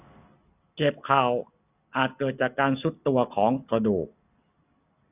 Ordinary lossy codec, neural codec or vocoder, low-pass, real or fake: none; none; 3.6 kHz; real